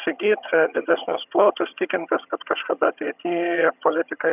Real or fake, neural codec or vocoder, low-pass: fake; vocoder, 22.05 kHz, 80 mel bands, HiFi-GAN; 3.6 kHz